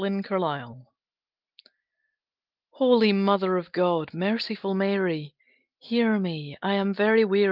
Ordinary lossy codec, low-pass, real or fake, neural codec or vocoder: Opus, 32 kbps; 5.4 kHz; real; none